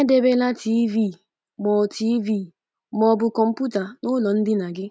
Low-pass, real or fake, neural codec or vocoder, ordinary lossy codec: none; real; none; none